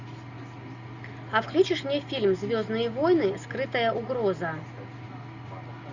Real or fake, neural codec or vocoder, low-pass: real; none; 7.2 kHz